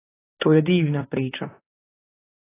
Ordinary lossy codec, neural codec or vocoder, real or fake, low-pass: AAC, 16 kbps; vocoder, 44.1 kHz, 128 mel bands, Pupu-Vocoder; fake; 3.6 kHz